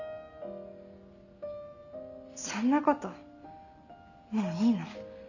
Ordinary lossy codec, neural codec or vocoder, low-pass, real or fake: none; none; 7.2 kHz; real